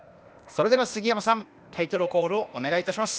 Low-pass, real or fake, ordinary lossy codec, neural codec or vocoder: none; fake; none; codec, 16 kHz, 0.8 kbps, ZipCodec